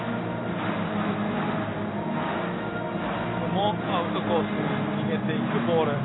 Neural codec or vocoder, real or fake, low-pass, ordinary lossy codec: none; real; 7.2 kHz; AAC, 16 kbps